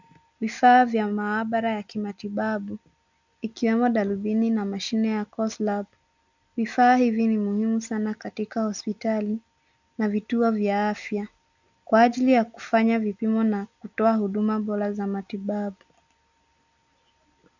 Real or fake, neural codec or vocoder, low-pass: real; none; 7.2 kHz